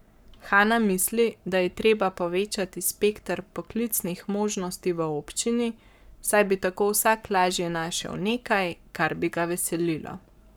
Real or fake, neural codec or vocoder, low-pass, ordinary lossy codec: fake; codec, 44.1 kHz, 7.8 kbps, Pupu-Codec; none; none